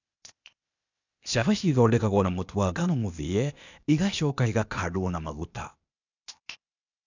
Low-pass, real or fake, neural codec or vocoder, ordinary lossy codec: 7.2 kHz; fake; codec, 16 kHz, 0.8 kbps, ZipCodec; none